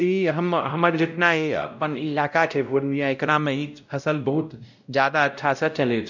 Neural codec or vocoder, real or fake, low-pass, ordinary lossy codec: codec, 16 kHz, 0.5 kbps, X-Codec, WavLM features, trained on Multilingual LibriSpeech; fake; 7.2 kHz; none